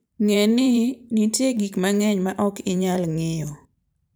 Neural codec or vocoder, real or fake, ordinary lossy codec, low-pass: vocoder, 44.1 kHz, 128 mel bands every 512 samples, BigVGAN v2; fake; none; none